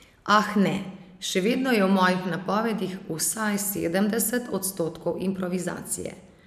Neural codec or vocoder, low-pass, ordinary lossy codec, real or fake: none; 14.4 kHz; none; real